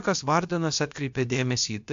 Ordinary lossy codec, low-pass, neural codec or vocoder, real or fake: AAC, 64 kbps; 7.2 kHz; codec, 16 kHz, about 1 kbps, DyCAST, with the encoder's durations; fake